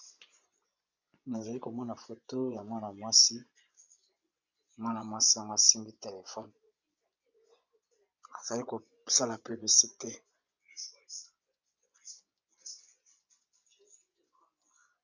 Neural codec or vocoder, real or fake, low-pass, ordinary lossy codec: vocoder, 24 kHz, 100 mel bands, Vocos; fake; 7.2 kHz; MP3, 64 kbps